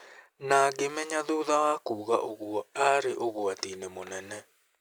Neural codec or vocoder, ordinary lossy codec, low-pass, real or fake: none; none; none; real